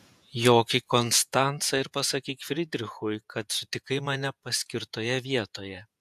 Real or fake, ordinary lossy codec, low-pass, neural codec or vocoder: fake; AAC, 96 kbps; 14.4 kHz; vocoder, 48 kHz, 128 mel bands, Vocos